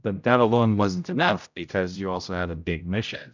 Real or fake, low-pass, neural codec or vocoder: fake; 7.2 kHz; codec, 16 kHz, 0.5 kbps, X-Codec, HuBERT features, trained on general audio